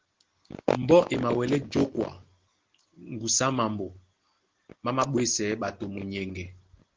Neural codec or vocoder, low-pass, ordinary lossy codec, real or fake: none; 7.2 kHz; Opus, 16 kbps; real